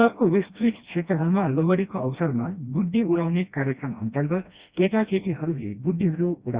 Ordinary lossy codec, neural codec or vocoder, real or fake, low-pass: Opus, 64 kbps; codec, 16 kHz, 1 kbps, FreqCodec, smaller model; fake; 3.6 kHz